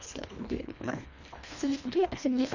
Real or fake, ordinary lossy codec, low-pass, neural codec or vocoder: fake; none; 7.2 kHz; codec, 24 kHz, 1.5 kbps, HILCodec